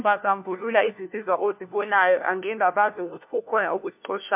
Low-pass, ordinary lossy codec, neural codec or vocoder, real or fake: 3.6 kHz; MP3, 32 kbps; codec, 16 kHz, 1 kbps, FunCodec, trained on LibriTTS, 50 frames a second; fake